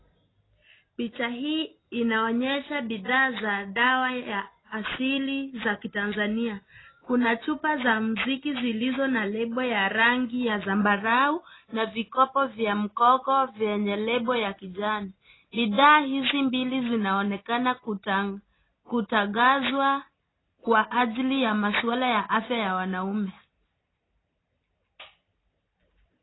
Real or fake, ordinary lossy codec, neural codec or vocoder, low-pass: real; AAC, 16 kbps; none; 7.2 kHz